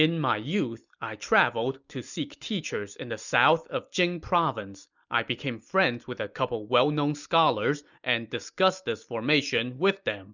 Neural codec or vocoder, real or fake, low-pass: none; real; 7.2 kHz